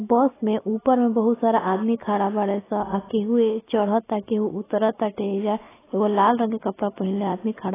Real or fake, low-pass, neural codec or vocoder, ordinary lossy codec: real; 3.6 kHz; none; AAC, 16 kbps